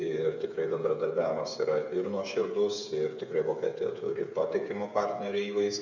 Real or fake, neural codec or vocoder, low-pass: fake; codec, 16 kHz, 8 kbps, FreqCodec, smaller model; 7.2 kHz